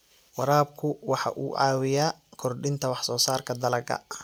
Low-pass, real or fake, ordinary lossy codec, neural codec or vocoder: none; real; none; none